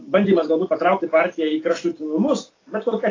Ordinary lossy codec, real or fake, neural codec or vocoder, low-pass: AAC, 32 kbps; real; none; 7.2 kHz